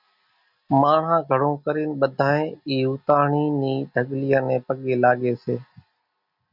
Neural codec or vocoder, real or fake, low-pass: none; real; 5.4 kHz